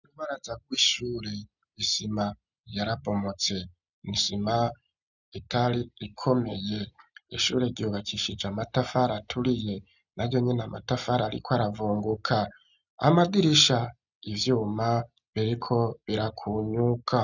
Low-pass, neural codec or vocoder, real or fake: 7.2 kHz; none; real